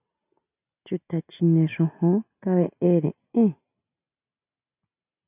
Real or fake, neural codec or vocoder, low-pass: real; none; 3.6 kHz